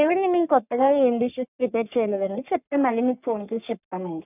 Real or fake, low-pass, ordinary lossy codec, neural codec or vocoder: fake; 3.6 kHz; none; codec, 44.1 kHz, 3.4 kbps, Pupu-Codec